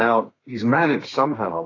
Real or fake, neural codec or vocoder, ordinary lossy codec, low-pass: fake; codec, 32 kHz, 1.9 kbps, SNAC; AAC, 32 kbps; 7.2 kHz